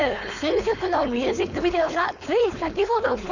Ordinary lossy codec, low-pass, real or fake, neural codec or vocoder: none; 7.2 kHz; fake; codec, 16 kHz, 4.8 kbps, FACodec